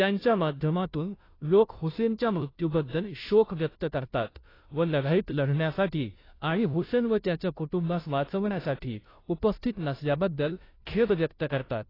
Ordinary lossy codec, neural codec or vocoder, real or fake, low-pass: AAC, 24 kbps; codec, 16 kHz, 0.5 kbps, FunCodec, trained on Chinese and English, 25 frames a second; fake; 5.4 kHz